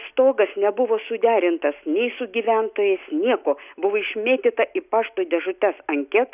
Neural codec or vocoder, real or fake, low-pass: none; real; 3.6 kHz